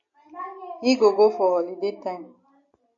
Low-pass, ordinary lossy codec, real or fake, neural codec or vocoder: 7.2 kHz; AAC, 48 kbps; real; none